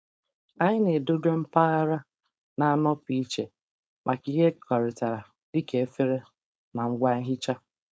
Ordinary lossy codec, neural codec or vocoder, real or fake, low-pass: none; codec, 16 kHz, 4.8 kbps, FACodec; fake; none